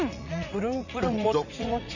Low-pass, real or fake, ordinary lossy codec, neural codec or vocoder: 7.2 kHz; fake; none; vocoder, 44.1 kHz, 80 mel bands, Vocos